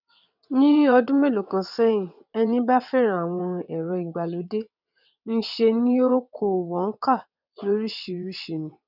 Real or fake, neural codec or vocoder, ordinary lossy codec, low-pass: fake; vocoder, 22.05 kHz, 80 mel bands, WaveNeXt; none; 5.4 kHz